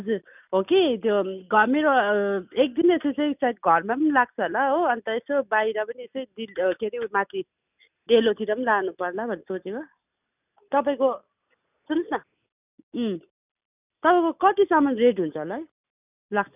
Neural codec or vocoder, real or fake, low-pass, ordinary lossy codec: none; real; 3.6 kHz; none